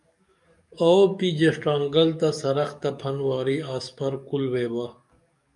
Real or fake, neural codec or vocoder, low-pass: fake; codec, 44.1 kHz, 7.8 kbps, DAC; 10.8 kHz